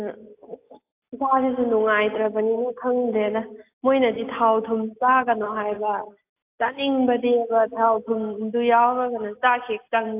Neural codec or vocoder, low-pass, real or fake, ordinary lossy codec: none; 3.6 kHz; real; AAC, 32 kbps